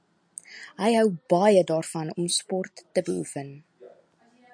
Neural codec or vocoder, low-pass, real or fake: none; 9.9 kHz; real